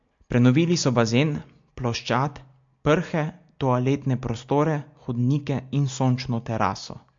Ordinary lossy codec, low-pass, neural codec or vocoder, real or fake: MP3, 48 kbps; 7.2 kHz; none; real